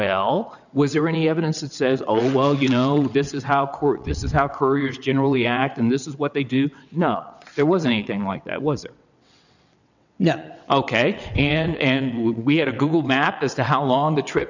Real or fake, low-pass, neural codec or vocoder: fake; 7.2 kHz; vocoder, 22.05 kHz, 80 mel bands, WaveNeXt